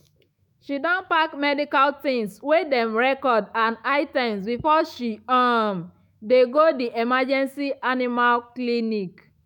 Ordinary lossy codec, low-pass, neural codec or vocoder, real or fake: none; 19.8 kHz; autoencoder, 48 kHz, 128 numbers a frame, DAC-VAE, trained on Japanese speech; fake